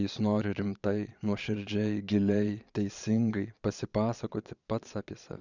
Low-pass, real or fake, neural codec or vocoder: 7.2 kHz; fake; vocoder, 22.05 kHz, 80 mel bands, Vocos